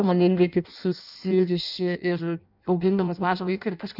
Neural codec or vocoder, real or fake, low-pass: codec, 16 kHz in and 24 kHz out, 0.6 kbps, FireRedTTS-2 codec; fake; 5.4 kHz